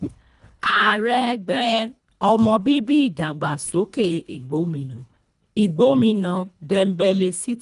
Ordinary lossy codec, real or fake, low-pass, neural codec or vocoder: none; fake; 10.8 kHz; codec, 24 kHz, 1.5 kbps, HILCodec